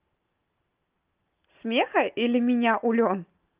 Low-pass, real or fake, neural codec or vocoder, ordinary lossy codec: 3.6 kHz; real; none; Opus, 32 kbps